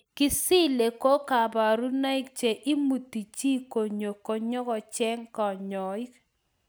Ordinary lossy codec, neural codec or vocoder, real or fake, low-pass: none; none; real; none